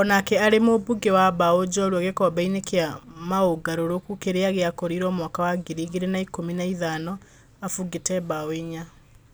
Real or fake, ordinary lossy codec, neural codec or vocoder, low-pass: real; none; none; none